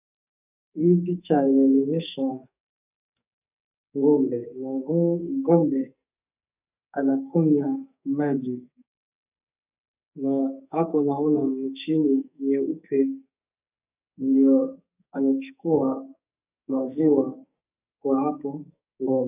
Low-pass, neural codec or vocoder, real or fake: 3.6 kHz; codec, 44.1 kHz, 2.6 kbps, SNAC; fake